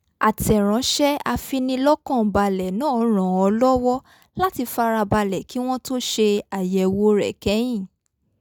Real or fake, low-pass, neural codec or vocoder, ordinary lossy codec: real; none; none; none